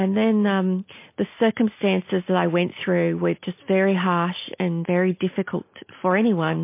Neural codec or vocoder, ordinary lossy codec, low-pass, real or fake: none; MP3, 24 kbps; 3.6 kHz; real